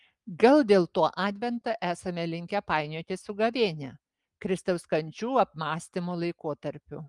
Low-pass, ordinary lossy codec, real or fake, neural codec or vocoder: 10.8 kHz; Opus, 32 kbps; real; none